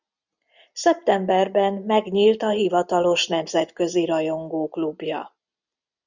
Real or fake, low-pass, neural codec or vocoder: real; 7.2 kHz; none